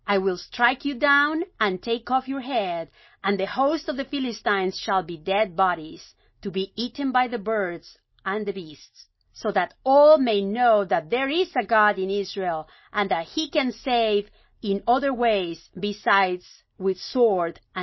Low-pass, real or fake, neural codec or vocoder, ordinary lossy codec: 7.2 kHz; real; none; MP3, 24 kbps